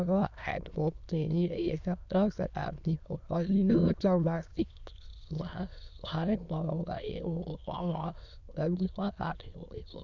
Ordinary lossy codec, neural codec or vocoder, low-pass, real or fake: none; autoencoder, 22.05 kHz, a latent of 192 numbers a frame, VITS, trained on many speakers; 7.2 kHz; fake